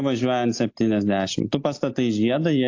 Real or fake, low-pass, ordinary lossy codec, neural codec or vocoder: real; 7.2 kHz; AAC, 48 kbps; none